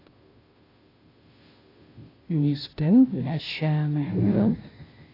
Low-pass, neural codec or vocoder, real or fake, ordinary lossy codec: 5.4 kHz; codec, 16 kHz, 0.5 kbps, FunCodec, trained on Chinese and English, 25 frames a second; fake; AAC, 24 kbps